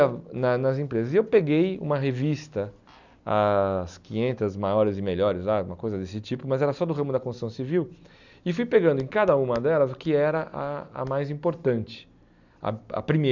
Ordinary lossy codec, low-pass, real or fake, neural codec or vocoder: none; 7.2 kHz; real; none